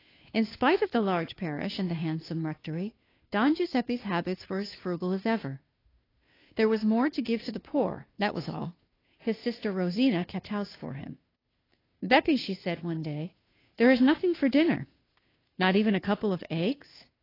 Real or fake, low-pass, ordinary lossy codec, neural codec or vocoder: fake; 5.4 kHz; AAC, 24 kbps; codec, 16 kHz, 2 kbps, FunCodec, trained on Chinese and English, 25 frames a second